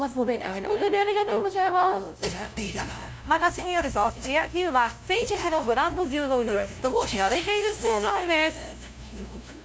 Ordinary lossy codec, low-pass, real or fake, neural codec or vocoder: none; none; fake; codec, 16 kHz, 0.5 kbps, FunCodec, trained on LibriTTS, 25 frames a second